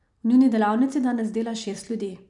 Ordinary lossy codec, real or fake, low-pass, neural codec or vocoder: none; real; 10.8 kHz; none